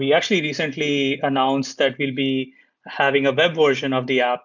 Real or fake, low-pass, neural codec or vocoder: real; 7.2 kHz; none